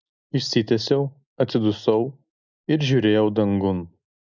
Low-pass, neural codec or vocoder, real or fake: 7.2 kHz; none; real